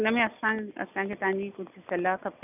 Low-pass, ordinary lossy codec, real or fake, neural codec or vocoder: 3.6 kHz; none; real; none